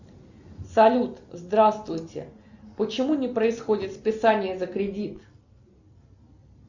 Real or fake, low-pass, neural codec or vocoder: real; 7.2 kHz; none